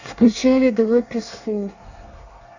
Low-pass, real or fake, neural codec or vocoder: 7.2 kHz; fake; codec, 24 kHz, 1 kbps, SNAC